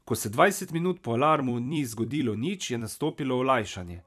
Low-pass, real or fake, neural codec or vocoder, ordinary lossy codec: 14.4 kHz; fake; vocoder, 44.1 kHz, 128 mel bands every 256 samples, BigVGAN v2; none